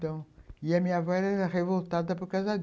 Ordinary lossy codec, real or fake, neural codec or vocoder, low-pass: none; real; none; none